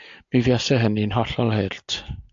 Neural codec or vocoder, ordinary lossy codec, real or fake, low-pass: none; AAC, 64 kbps; real; 7.2 kHz